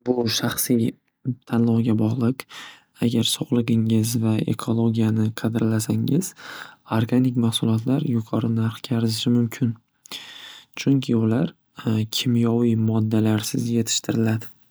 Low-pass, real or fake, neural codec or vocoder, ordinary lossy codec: none; real; none; none